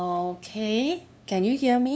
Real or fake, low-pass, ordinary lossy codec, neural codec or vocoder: fake; none; none; codec, 16 kHz, 2 kbps, FunCodec, trained on LibriTTS, 25 frames a second